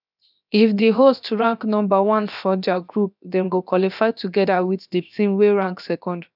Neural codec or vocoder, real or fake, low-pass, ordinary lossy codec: codec, 16 kHz, 0.7 kbps, FocalCodec; fake; 5.4 kHz; none